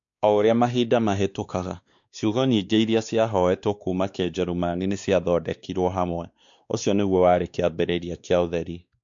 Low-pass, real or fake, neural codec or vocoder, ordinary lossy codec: 7.2 kHz; fake; codec, 16 kHz, 2 kbps, X-Codec, WavLM features, trained on Multilingual LibriSpeech; MP3, 64 kbps